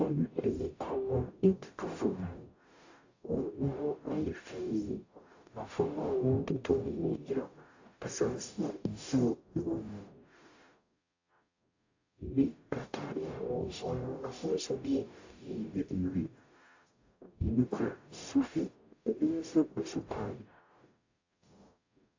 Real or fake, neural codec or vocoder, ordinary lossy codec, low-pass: fake; codec, 44.1 kHz, 0.9 kbps, DAC; AAC, 48 kbps; 7.2 kHz